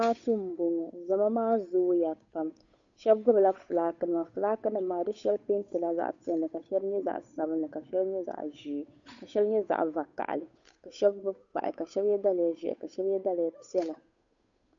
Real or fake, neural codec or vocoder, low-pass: fake; codec, 16 kHz, 8 kbps, FunCodec, trained on Chinese and English, 25 frames a second; 7.2 kHz